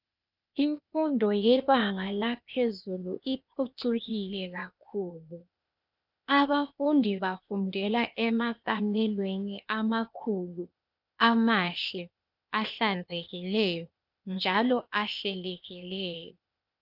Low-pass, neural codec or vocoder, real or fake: 5.4 kHz; codec, 16 kHz, 0.8 kbps, ZipCodec; fake